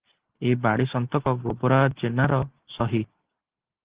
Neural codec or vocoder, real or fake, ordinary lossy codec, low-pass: none; real; Opus, 16 kbps; 3.6 kHz